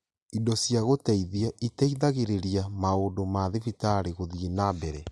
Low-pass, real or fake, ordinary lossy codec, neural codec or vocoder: none; real; none; none